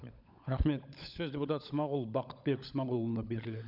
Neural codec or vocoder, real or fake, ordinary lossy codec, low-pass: codec, 16 kHz, 16 kbps, FunCodec, trained on LibriTTS, 50 frames a second; fake; none; 5.4 kHz